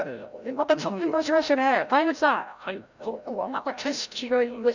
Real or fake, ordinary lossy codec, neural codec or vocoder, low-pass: fake; none; codec, 16 kHz, 0.5 kbps, FreqCodec, larger model; 7.2 kHz